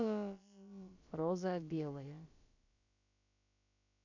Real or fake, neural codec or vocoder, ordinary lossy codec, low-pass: fake; codec, 16 kHz, about 1 kbps, DyCAST, with the encoder's durations; none; 7.2 kHz